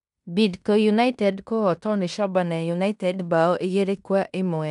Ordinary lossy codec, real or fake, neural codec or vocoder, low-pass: MP3, 96 kbps; fake; codec, 16 kHz in and 24 kHz out, 0.9 kbps, LongCat-Audio-Codec, fine tuned four codebook decoder; 10.8 kHz